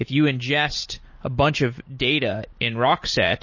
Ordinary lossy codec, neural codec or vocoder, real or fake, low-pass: MP3, 32 kbps; none; real; 7.2 kHz